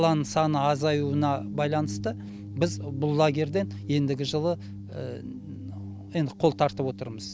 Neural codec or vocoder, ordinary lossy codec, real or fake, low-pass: none; none; real; none